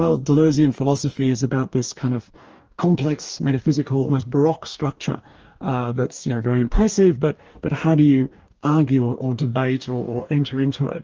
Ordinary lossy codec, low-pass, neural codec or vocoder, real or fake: Opus, 24 kbps; 7.2 kHz; codec, 44.1 kHz, 2.6 kbps, DAC; fake